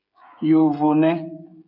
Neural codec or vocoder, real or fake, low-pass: codec, 16 kHz, 16 kbps, FreqCodec, smaller model; fake; 5.4 kHz